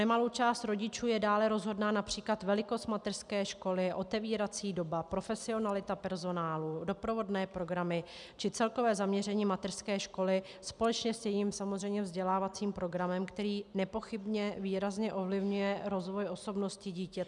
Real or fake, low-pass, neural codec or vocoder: real; 10.8 kHz; none